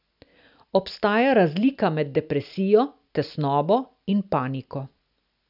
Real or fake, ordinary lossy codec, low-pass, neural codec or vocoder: real; none; 5.4 kHz; none